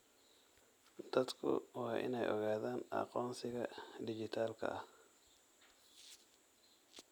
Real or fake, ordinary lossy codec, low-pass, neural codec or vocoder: real; none; none; none